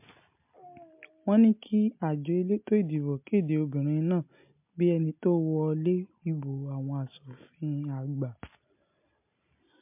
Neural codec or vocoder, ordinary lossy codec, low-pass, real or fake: none; MP3, 32 kbps; 3.6 kHz; real